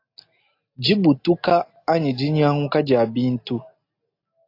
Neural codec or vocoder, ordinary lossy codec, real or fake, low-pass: none; AAC, 32 kbps; real; 5.4 kHz